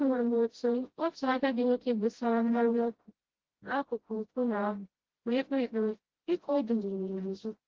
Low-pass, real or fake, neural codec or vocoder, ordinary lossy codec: 7.2 kHz; fake; codec, 16 kHz, 0.5 kbps, FreqCodec, smaller model; Opus, 16 kbps